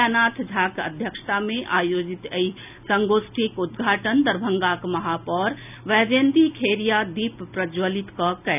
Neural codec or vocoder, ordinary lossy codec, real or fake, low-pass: none; none; real; 3.6 kHz